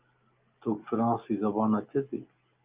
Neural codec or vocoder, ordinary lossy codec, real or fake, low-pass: none; Opus, 32 kbps; real; 3.6 kHz